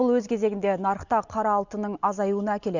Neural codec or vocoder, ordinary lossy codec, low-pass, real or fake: none; none; 7.2 kHz; real